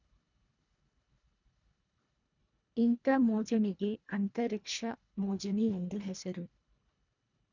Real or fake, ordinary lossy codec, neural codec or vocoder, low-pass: fake; none; codec, 24 kHz, 1.5 kbps, HILCodec; 7.2 kHz